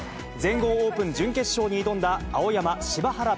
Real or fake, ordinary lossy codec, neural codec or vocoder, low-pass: real; none; none; none